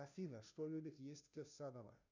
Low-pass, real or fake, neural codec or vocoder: 7.2 kHz; fake; codec, 16 kHz, 0.5 kbps, FunCodec, trained on Chinese and English, 25 frames a second